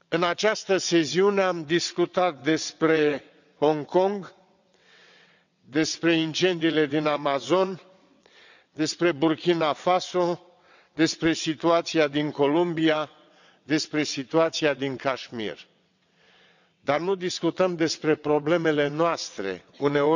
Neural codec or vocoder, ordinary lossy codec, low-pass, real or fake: vocoder, 22.05 kHz, 80 mel bands, WaveNeXt; none; 7.2 kHz; fake